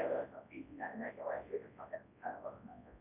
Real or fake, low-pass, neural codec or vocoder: fake; 3.6 kHz; codec, 24 kHz, 0.9 kbps, WavTokenizer, large speech release